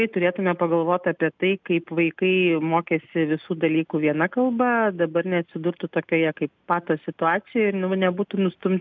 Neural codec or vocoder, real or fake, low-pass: none; real; 7.2 kHz